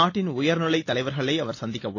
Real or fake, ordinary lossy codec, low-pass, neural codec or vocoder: real; AAC, 32 kbps; 7.2 kHz; none